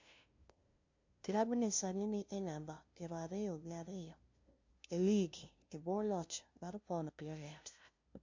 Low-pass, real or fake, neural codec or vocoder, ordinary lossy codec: 7.2 kHz; fake; codec, 16 kHz, 0.5 kbps, FunCodec, trained on LibriTTS, 25 frames a second; MP3, 48 kbps